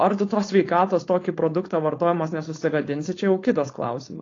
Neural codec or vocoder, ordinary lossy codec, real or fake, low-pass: codec, 16 kHz, 4.8 kbps, FACodec; AAC, 32 kbps; fake; 7.2 kHz